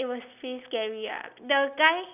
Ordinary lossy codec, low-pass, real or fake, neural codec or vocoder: none; 3.6 kHz; real; none